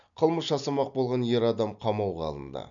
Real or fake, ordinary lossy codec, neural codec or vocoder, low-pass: real; none; none; 7.2 kHz